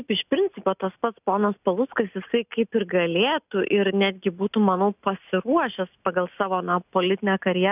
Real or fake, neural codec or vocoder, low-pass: real; none; 3.6 kHz